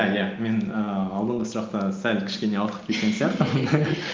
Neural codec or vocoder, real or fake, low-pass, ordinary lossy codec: none; real; 7.2 kHz; Opus, 32 kbps